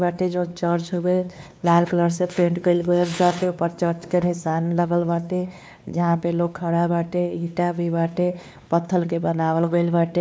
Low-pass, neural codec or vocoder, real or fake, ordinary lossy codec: none; codec, 16 kHz, 2 kbps, X-Codec, WavLM features, trained on Multilingual LibriSpeech; fake; none